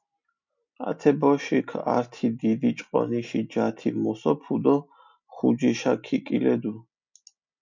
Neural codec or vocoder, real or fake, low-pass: none; real; 7.2 kHz